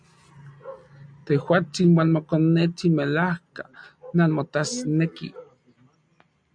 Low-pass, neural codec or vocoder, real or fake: 9.9 kHz; none; real